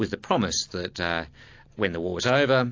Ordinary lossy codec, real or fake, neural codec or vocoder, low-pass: AAC, 48 kbps; real; none; 7.2 kHz